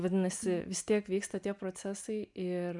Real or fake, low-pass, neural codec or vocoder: real; 10.8 kHz; none